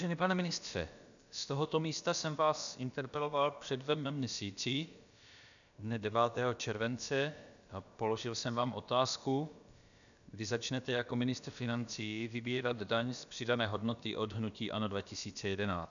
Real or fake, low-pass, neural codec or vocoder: fake; 7.2 kHz; codec, 16 kHz, about 1 kbps, DyCAST, with the encoder's durations